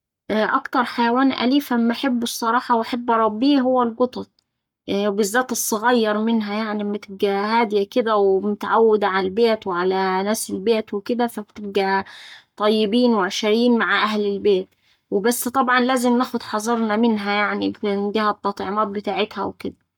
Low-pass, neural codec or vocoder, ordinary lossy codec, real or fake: 19.8 kHz; codec, 44.1 kHz, 7.8 kbps, Pupu-Codec; none; fake